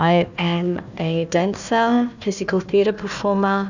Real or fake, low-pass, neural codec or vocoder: fake; 7.2 kHz; codec, 16 kHz, 1 kbps, FunCodec, trained on Chinese and English, 50 frames a second